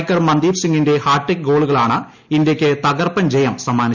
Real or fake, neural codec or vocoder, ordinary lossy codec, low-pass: real; none; none; 7.2 kHz